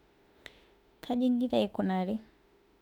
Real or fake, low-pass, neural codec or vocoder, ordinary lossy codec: fake; 19.8 kHz; autoencoder, 48 kHz, 32 numbers a frame, DAC-VAE, trained on Japanese speech; none